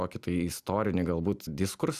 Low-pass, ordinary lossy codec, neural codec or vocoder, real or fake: 14.4 kHz; Opus, 64 kbps; none; real